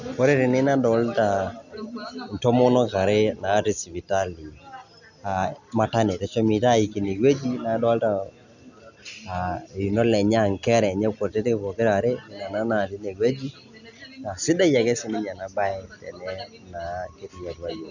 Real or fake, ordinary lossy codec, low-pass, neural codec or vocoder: real; none; 7.2 kHz; none